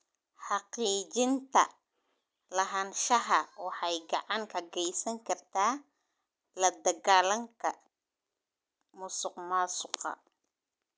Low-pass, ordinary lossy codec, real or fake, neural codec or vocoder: none; none; real; none